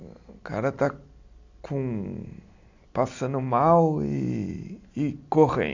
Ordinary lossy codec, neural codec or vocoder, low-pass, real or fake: AAC, 48 kbps; none; 7.2 kHz; real